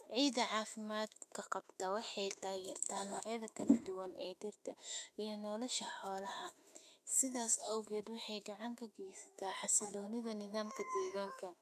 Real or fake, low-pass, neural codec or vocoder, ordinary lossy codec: fake; 14.4 kHz; autoencoder, 48 kHz, 32 numbers a frame, DAC-VAE, trained on Japanese speech; none